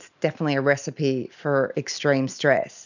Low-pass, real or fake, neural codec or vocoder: 7.2 kHz; real; none